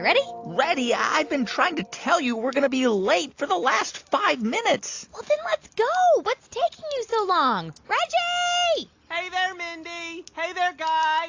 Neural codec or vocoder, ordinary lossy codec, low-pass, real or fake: none; AAC, 48 kbps; 7.2 kHz; real